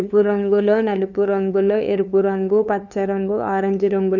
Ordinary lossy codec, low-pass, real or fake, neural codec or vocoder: none; 7.2 kHz; fake; codec, 16 kHz, 2 kbps, FunCodec, trained on LibriTTS, 25 frames a second